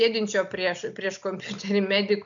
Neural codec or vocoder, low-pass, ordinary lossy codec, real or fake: none; 7.2 kHz; MP3, 64 kbps; real